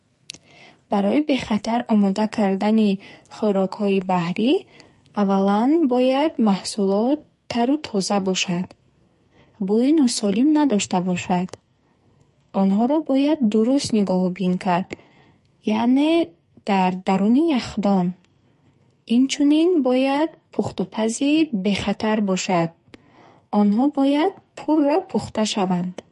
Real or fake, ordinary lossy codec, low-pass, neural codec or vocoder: fake; MP3, 48 kbps; 14.4 kHz; codec, 44.1 kHz, 2.6 kbps, SNAC